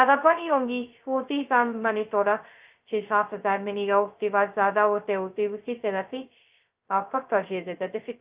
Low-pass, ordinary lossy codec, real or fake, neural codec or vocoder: 3.6 kHz; Opus, 24 kbps; fake; codec, 16 kHz, 0.2 kbps, FocalCodec